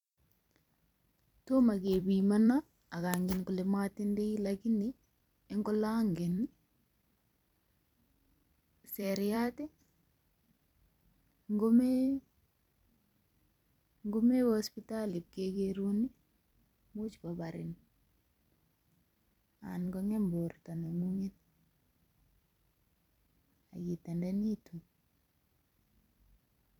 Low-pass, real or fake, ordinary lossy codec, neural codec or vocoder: 19.8 kHz; fake; none; vocoder, 44.1 kHz, 128 mel bands every 256 samples, BigVGAN v2